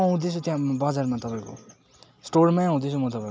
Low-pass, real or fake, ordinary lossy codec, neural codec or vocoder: none; real; none; none